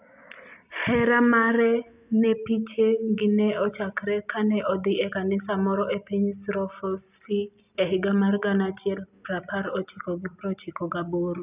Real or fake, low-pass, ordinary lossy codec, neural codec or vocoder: real; 3.6 kHz; none; none